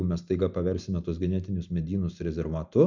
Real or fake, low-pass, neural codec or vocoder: real; 7.2 kHz; none